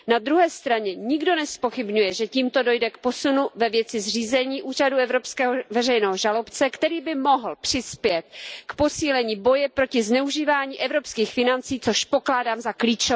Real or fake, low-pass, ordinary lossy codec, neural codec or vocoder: real; none; none; none